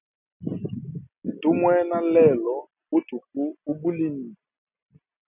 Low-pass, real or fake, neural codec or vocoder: 3.6 kHz; real; none